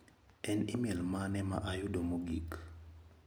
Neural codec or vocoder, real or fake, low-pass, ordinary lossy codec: none; real; none; none